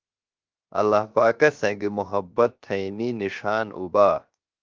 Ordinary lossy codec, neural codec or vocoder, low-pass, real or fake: Opus, 32 kbps; codec, 16 kHz, 0.7 kbps, FocalCodec; 7.2 kHz; fake